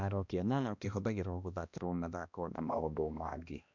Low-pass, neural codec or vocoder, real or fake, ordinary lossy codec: 7.2 kHz; codec, 16 kHz, 1 kbps, X-Codec, HuBERT features, trained on balanced general audio; fake; none